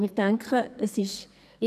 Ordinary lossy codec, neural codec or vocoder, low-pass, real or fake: none; codec, 44.1 kHz, 2.6 kbps, SNAC; 14.4 kHz; fake